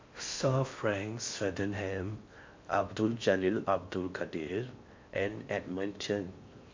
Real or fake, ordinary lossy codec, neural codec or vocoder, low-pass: fake; MP3, 48 kbps; codec, 16 kHz in and 24 kHz out, 0.6 kbps, FocalCodec, streaming, 4096 codes; 7.2 kHz